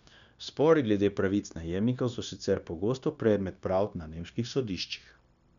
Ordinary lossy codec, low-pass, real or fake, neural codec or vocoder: none; 7.2 kHz; fake; codec, 16 kHz, 0.9 kbps, LongCat-Audio-Codec